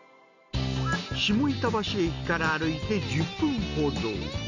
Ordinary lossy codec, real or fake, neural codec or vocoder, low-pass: none; real; none; 7.2 kHz